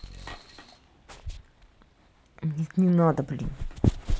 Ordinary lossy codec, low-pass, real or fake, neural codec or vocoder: none; none; real; none